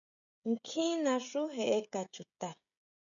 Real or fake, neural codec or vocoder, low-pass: fake; codec, 16 kHz, 16 kbps, FreqCodec, smaller model; 7.2 kHz